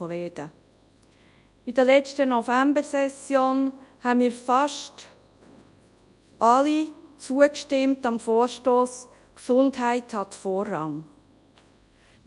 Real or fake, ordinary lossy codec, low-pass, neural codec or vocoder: fake; AAC, 96 kbps; 10.8 kHz; codec, 24 kHz, 0.9 kbps, WavTokenizer, large speech release